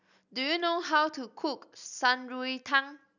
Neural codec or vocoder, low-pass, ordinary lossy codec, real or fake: none; 7.2 kHz; none; real